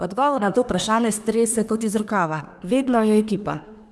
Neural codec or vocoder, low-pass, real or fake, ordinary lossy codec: codec, 24 kHz, 1 kbps, SNAC; none; fake; none